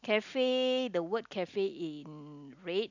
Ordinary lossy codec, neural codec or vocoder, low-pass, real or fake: none; none; 7.2 kHz; real